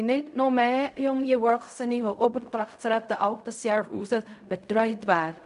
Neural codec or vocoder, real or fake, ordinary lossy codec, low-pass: codec, 16 kHz in and 24 kHz out, 0.4 kbps, LongCat-Audio-Codec, fine tuned four codebook decoder; fake; none; 10.8 kHz